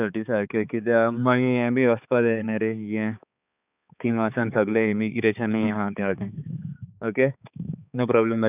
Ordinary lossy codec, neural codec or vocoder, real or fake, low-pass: none; codec, 16 kHz, 4 kbps, X-Codec, HuBERT features, trained on balanced general audio; fake; 3.6 kHz